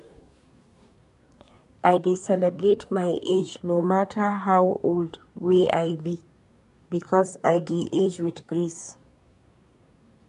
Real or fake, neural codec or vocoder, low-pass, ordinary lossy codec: fake; codec, 24 kHz, 1 kbps, SNAC; 10.8 kHz; none